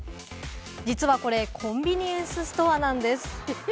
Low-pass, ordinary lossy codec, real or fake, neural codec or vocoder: none; none; real; none